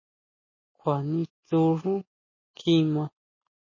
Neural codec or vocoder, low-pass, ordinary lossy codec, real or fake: none; 7.2 kHz; MP3, 32 kbps; real